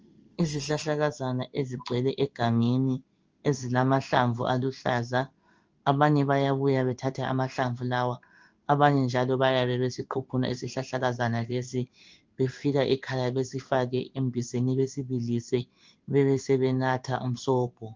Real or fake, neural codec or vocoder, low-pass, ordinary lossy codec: fake; codec, 16 kHz in and 24 kHz out, 1 kbps, XY-Tokenizer; 7.2 kHz; Opus, 24 kbps